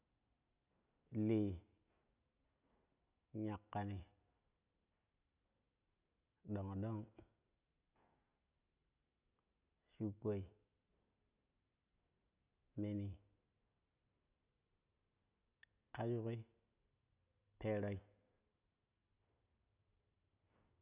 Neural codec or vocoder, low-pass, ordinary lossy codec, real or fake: none; 3.6 kHz; none; real